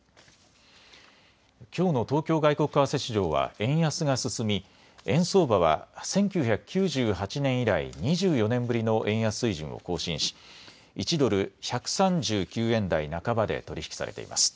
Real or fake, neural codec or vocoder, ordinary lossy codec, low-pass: real; none; none; none